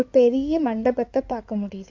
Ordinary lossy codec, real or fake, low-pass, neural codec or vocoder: MP3, 64 kbps; fake; 7.2 kHz; codec, 16 kHz in and 24 kHz out, 2.2 kbps, FireRedTTS-2 codec